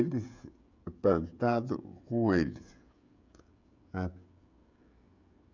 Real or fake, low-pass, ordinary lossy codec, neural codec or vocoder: fake; 7.2 kHz; MP3, 64 kbps; codec, 16 kHz, 16 kbps, FreqCodec, smaller model